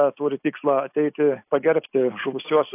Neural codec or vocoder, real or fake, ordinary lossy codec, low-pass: none; real; AAC, 32 kbps; 3.6 kHz